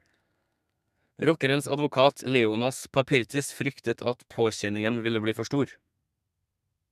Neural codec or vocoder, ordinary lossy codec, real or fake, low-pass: codec, 32 kHz, 1.9 kbps, SNAC; none; fake; 14.4 kHz